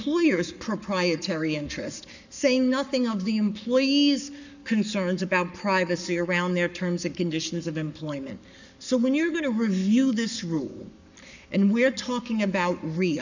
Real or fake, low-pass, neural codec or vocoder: fake; 7.2 kHz; codec, 44.1 kHz, 7.8 kbps, Pupu-Codec